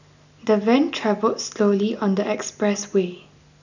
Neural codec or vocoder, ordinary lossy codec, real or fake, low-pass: none; none; real; 7.2 kHz